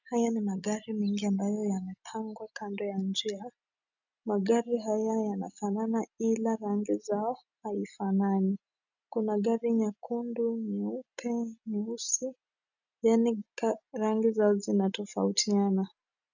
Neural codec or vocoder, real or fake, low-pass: none; real; 7.2 kHz